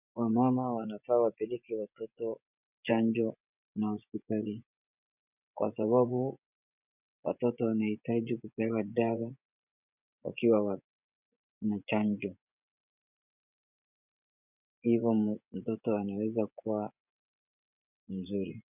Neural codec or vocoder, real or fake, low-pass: vocoder, 24 kHz, 100 mel bands, Vocos; fake; 3.6 kHz